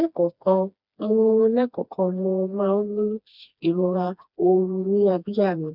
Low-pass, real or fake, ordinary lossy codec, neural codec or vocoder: 5.4 kHz; fake; none; codec, 16 kHz, 2 kbps, FreqCodec, smaller model